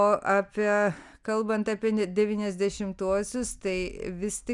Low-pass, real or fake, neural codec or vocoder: 10.8 kHz; real; none